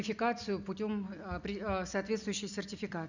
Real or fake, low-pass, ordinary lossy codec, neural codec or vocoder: real; 7.2 kHz; none; none